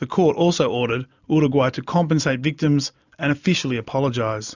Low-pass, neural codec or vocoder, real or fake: 7.2 kHz; none; real